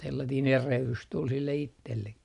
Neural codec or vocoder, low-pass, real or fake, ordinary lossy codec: none; 10.8 kHz; real; none